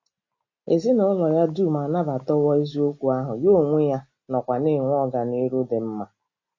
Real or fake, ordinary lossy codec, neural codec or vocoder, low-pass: real; MP3, 32 kbps; none; 7.2 kHz